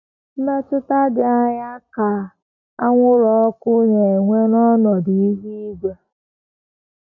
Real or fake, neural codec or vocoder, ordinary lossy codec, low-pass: real; none; none; 7.2 kHz